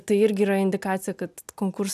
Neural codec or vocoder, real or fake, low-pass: none; real; 14.4 kHz